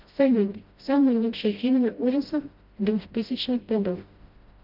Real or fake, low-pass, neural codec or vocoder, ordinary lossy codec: fake; 5.4 kHz; codec, 16 kHz, 0.5 kbps, FreqCodec, smaller model; Opus, 24 kbps